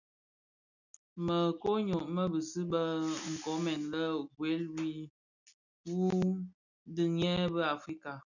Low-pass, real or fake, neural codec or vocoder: 7.2 kHz; real; none